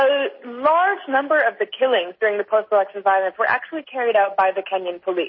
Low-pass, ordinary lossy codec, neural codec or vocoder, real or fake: 7.2 kHz; MP3, 24 kbps; none; real